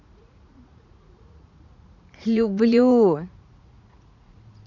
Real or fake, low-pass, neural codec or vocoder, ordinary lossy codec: fake; 7.2 kHz; vocoder, 44.1 kHz, 80 mel bands, Vocos; none